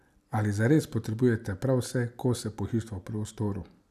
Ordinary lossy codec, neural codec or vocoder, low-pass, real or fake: none; none; 14.4 kHz; real